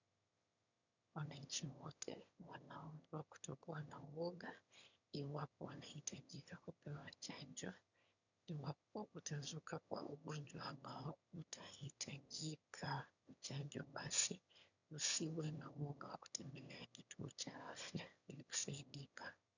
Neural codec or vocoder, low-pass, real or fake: autoencoder, 22.05 kHz, a latent of 192 numbers a frame, VITS, trained on one speaker; 7.2 kHz; fake